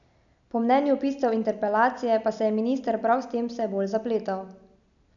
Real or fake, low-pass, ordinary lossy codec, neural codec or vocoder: real; 7.2 kHz; none; none